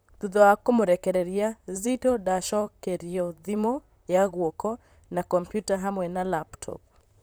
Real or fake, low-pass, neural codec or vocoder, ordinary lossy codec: fake; none; vocoder, 44.1 kHz, 128 mel bands, Pupu-Vocoder; none